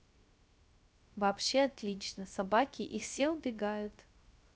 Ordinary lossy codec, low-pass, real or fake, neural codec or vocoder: none; none; fake; codec, 16 kHz, 0.3 kbps, FocalCodec